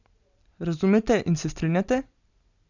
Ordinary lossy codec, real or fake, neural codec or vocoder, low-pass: none; real; none; 7.2 kHz